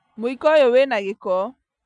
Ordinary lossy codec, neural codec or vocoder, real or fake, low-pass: none; none; real; 9.9 kHz